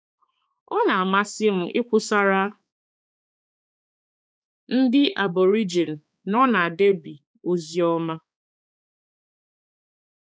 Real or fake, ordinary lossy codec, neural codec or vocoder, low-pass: fake; none; codec, 16 kHz, 4 kbps, X-Codec, HuBERT features, trained on balanced general audio; none